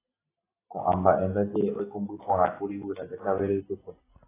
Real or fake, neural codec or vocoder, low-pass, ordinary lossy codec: real; none; 3.6 kHz; AAC, 16 kbps